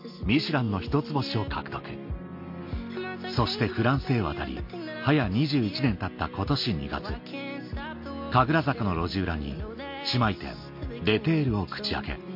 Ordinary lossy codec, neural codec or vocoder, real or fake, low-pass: MP3, 32 kbps; none; real; 5.4 kHz